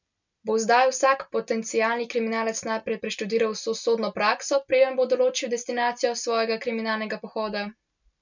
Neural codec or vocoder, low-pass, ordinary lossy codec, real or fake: none; 7.2 kHz; none; real